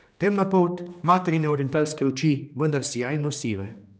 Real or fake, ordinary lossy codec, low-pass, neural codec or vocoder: fake; none; none; codec, 16 kHz, 2 kbps, X-Codec, HuBERT features, trained on general audio